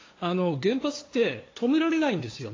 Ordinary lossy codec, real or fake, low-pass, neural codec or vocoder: AAC, 32 kbps; fake; 7.2 kHz; codec, 16 kHz, 2 kbps, FunCodec, trained on LibriTTS, 25 frames a second